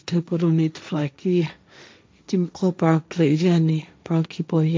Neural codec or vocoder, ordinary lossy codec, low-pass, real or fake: codec, 16 kHz, 1.1 kbps, Voila-Tokenizer; none; none; fake